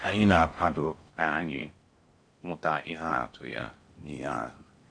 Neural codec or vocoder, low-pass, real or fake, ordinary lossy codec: codec, 16 kHz in and 24 kHz out, 0.6 kbps, FocalCodec, streaming, 4096 codes; 9.9 kHz; fake; Opus, 64 kbps